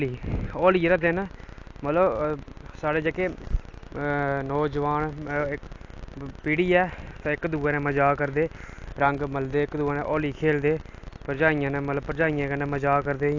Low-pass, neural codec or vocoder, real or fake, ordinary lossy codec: 7.2 kHz; none; real; AAC, 48 kbps